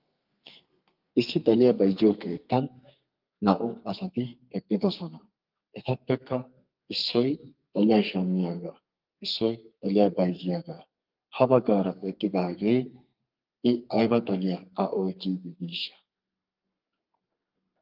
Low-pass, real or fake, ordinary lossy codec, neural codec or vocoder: 5.4 kHz; fake; Opus, 24 kbps; codec, 32 kHz, 1.9 kbps, SNAC